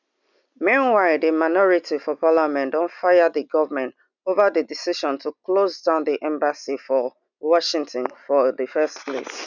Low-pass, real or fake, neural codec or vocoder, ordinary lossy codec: 7.2 kHz; real; none; none